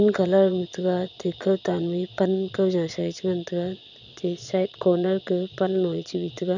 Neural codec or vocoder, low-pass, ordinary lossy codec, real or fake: vocoder, 22.05 kHz, 80 mel bands, Vocos; 7.2 kHz; none; fake